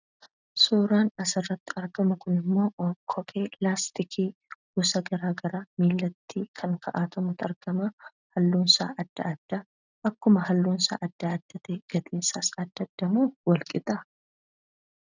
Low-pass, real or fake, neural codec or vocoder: 7.2 kHz; real; none